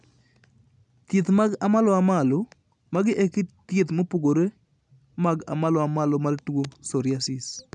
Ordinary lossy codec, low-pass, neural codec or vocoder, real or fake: none; 10.8 kHz; none; real